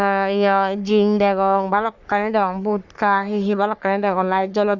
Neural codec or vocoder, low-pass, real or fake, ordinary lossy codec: codec, 44.1 kHz, 3.4 kbps, Pupu-Codec; 7.2 kHz; fake; none